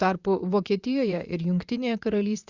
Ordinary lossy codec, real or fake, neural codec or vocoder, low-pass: Opus, 64 kbps; fake; vocoder, 44.1 kHz, 128 mel bands, Pupu-Vocoder; 7.2 kHz